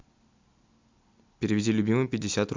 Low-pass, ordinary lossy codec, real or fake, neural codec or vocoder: 7.2 kHz; MP3, 64 kbps; real; none